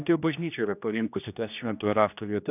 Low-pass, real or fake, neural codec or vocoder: 3.6 kHz; fake; codec, 16 kHz, 1 kbps, X-Codec, HuBERT features, trained on general audio